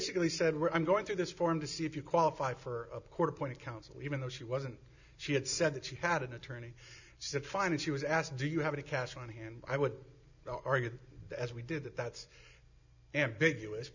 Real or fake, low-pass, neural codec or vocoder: real; 7.2 kHz; none